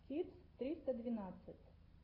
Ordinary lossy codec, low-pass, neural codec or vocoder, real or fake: AAC, 24 kbps; 5.4 kHz; none; real